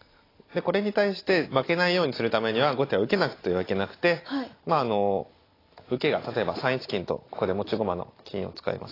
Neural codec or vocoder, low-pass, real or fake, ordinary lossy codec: none; 5.4 kHz; real; AAC, 24 kbps